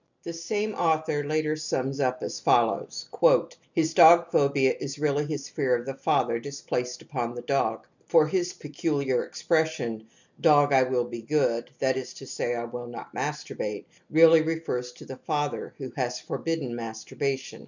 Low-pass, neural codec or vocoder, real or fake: 7.2 kHz; none; real